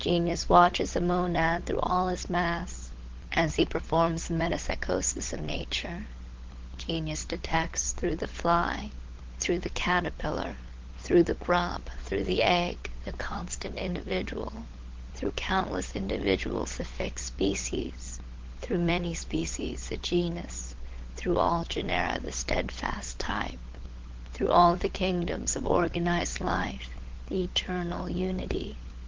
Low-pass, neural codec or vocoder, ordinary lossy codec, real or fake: 7.2 kHz; codec, 16 kHz, 16 kbps, FunCodec, trained on LibriTTS, 50 frames a second; Opus, 16 kbps; fake